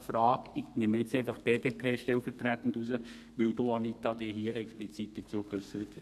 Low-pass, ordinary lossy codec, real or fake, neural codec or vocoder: 14.4 kHz; AAC, 96 kbps; fake; codec, 32 kHz, 1.9 kbps, SNAC